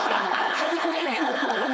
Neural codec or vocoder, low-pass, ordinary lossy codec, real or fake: codec, 16 kHz, 4.8 kbps, FACodec; none; none; fake